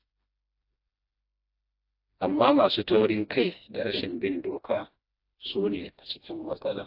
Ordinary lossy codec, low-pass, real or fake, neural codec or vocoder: AAC, 32 kbps; 5.4 kHz; fake; codec, 16 kHz, 1 kbps, FreqCodec, smaller model